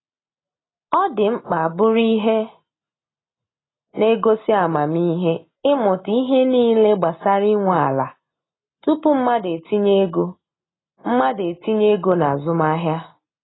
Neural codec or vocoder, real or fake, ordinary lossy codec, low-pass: none; real; AAC, 16 kbps; 7.2 kHz